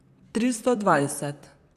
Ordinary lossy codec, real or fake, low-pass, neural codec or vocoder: none; fake; 14.4 kHz; vocoder, 44.1 kHz, 128 mel bands, Pupu-Vocoder